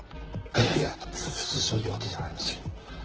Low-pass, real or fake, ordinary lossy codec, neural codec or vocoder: 7.2 kHz; fake; Opus, 16 kbps; vocoder, 44.1 kHz, 128 mel bands, Pupu-Vocoder